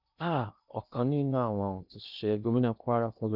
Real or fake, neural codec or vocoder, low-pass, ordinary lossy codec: fake; codec, 16 kHz in and 24 kHz out, 0.8 kbps, FocalCodec, streaming, 65536 codes; 5.4 kHz; none